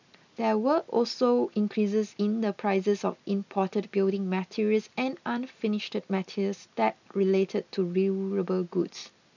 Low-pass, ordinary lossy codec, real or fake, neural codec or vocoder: 7.2 kHz; none; real; none